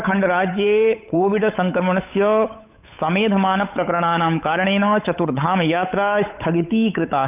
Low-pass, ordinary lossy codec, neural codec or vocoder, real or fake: 3.6 kHz; none; codec, 16 kHz, 8 kbps, FunCodec, trained on Chinese and English, 25 frames a second; fake